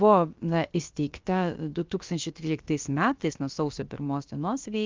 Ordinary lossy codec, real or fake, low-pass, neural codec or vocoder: Opus, 24 kbps; fake; 7.2 kHz; codec, 16 kHz, about 1 kbps, DyCAST, with the encoder's durations